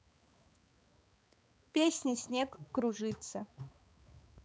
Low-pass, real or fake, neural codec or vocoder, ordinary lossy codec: none; fake; codec, 16 kHz, 4 kbps, X-Codec, HuBERT features, trained on balanced general audio; none